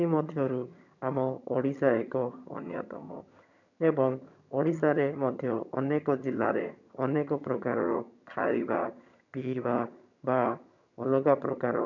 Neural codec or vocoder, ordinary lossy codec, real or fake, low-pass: vocoder, 22.05 kHz, 80 mel bands, HiFi-GAN; none; fake; 7.2 kHz